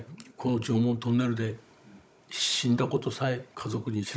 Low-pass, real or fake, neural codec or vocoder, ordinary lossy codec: none; fake; codec, 16 kHz, 16 kbps, FunCodec, trained on Chinese and English, 50 frames a second; none